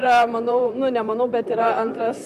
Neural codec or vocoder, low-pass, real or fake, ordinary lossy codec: vocoder, 44.1 kHz, 128 mel bands, Pupu-Vocoder; 14.4 kHz; fake; MP3, 96 kbps